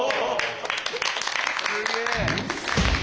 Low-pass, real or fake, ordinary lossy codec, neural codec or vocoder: none; real; none; none